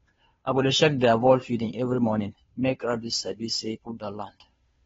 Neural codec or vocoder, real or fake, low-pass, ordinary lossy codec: codec, 16 kHz, 2 kbps, FunCodec, trained on Chinese and English, 25 frames a second; fake; 7.2 kHz; AAC, 24 kbps